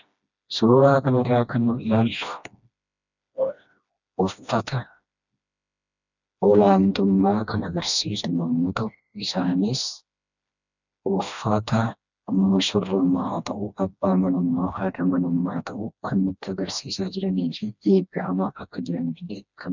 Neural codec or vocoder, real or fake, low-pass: codec, 16 kHz, 1 kbps, FreqCodec, smaller model; fake; 7.2 kHz